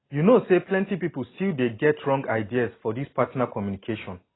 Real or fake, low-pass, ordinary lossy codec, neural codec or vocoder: real; 7.2 kHz; AAC, 16 kbps; none